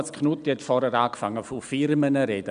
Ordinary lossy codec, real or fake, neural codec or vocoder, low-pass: none; real; none; 9.9 kHz